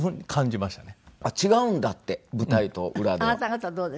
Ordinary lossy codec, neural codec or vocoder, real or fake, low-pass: none; none; real; none